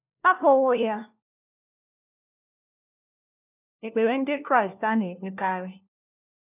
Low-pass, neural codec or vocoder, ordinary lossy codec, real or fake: 3.6 kHz; codec, 16 kHz, 1 kbps, FunCodec, trained on LibriTTS, 50 frames a second; none; fake